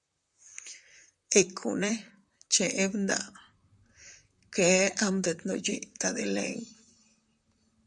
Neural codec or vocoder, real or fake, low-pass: vocoder, 44.1 kHz, 128 mel bands, Pupu-Vocoder; fake; 10.8 kHz